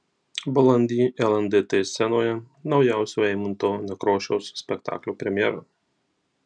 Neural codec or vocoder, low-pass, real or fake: none; 9.9 kHz; real